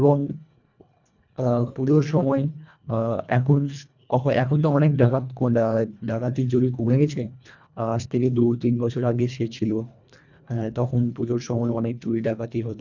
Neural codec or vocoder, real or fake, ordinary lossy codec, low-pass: codec, 24 kHz, 1.5 kbps, HILCodec; fake; none; 7.2 kHz